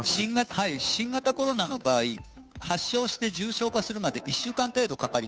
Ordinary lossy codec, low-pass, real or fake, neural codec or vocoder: none; none; fake; codec, 16 kHz, 2 kbps, FunCodec, trained on Chinese and English, 25 frames a second